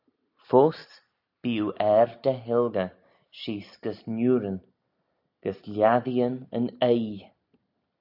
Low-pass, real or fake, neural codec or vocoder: 5.4 kHz; real; none